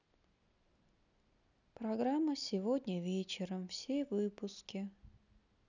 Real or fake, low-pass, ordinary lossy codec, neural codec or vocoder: real; 7.2 kHz; none; none